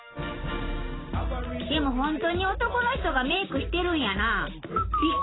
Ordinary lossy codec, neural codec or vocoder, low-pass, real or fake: AAC, 16 kbps; none; 7.2 kHz; real